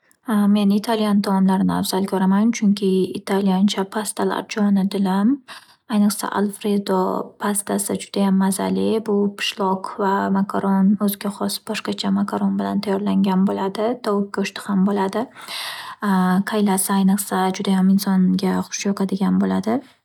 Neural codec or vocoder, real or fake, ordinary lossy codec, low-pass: none; real; none; 19.8 kHz